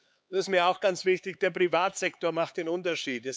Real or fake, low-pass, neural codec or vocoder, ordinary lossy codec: fake; none; codec, 16 kHz, 4 kbps, X-Codec, HuBERT features, trained on LibriSpeech; none